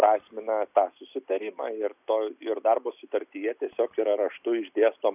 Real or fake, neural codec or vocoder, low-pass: real; none; 3.6 kHz